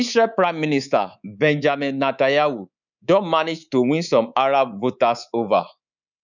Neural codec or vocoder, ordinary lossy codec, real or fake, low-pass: codec, 24 kHz, 3.1 kbps, DualCodec; none; fake; 7.2 kHz